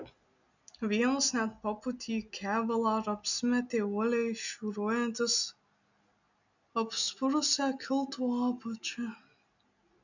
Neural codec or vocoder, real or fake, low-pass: none; real; 7.2 kHz